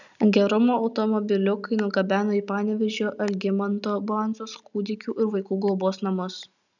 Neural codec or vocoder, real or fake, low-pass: none; real; 7.2 kHz